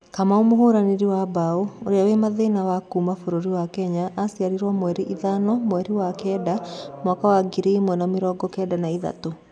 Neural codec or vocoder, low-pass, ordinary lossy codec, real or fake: none; none; none; real